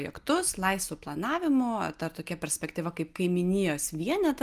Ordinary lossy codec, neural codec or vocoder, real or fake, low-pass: Opus, 32 kbps; none; real; 14.4 kHz